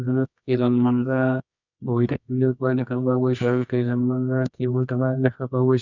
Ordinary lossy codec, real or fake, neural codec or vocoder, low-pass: none; fake; codec, 24 kHz, 0.9 kbps, WavTokenizer, medium music audio release; 7.2 kHz